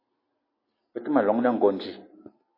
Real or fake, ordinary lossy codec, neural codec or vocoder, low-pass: real; MP3, 24 kbps; none; 5.4 kHz